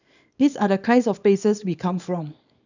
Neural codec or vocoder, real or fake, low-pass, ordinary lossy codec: codec, 24 kHz, 0.9 kbps, WavTokenizer, small release; fake; 7.2 kHz; none